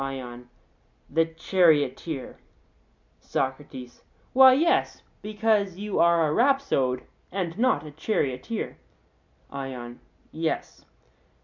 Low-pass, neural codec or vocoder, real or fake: 7.2 kHz; none; real